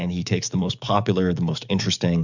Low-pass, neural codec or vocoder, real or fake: 7.2 kHz; codec, 44.1 kHz, 7.8 kbps, DAC; fake